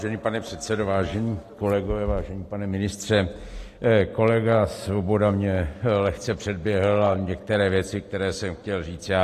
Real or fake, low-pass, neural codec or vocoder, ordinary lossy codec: real; 14.4 kHz; none; AAC, 48 kbps